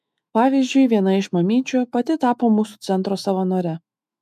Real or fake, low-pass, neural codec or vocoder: fake; 14.4 kHz; autoencoder, 48 kHz, 128 numbers a frame, DAC-VAE, trained on Japanese speech